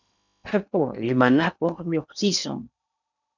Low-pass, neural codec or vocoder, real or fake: 7.2 kHz; codec, 16 kHz in and 24 kHz out, 0.8 kbps, FocalCodec, streaming, 65536 codes; fake